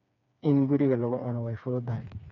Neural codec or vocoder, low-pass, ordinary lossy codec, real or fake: codec, 16 kHz, 4 kbps, FreqCodec, smaller model; 7.2 kHz; none; fake